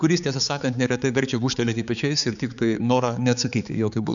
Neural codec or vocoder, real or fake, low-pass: codec, 16 kHz, 4 kbps, X-Codec, HuBERT features, trained on balanced general audio; fake; 7.2 kHz